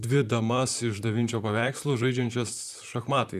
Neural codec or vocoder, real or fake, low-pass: vocoder, 44.1 kHz, 128 mel bands, Pupu-Vocoder; fake; 14.4 kHz